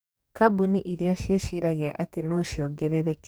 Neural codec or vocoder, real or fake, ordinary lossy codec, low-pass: codec, 44.1 kHz, 2.6 kbps, DAC; fake; none; none